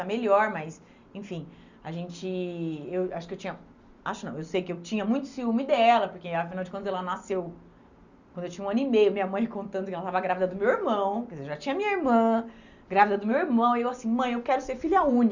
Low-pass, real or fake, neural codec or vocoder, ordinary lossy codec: 7.2 kHz; real; none; Opus, 64 kbps